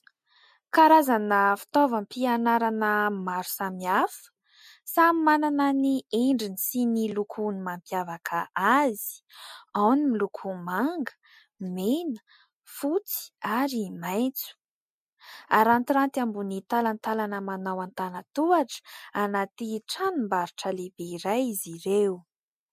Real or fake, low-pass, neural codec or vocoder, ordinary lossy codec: real; 14.4 kHz; none; MP3, 64 kbps